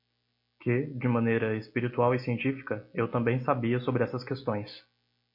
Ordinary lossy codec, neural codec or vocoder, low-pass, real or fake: MP3, 32 kbps; none; 5.4 kHz; real